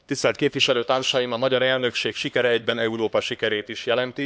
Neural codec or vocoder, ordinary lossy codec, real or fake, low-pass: codec, 16 kHz, 2 kbps, X-Codec, HuBERT features, trained on LibriSpeech; none; fake; none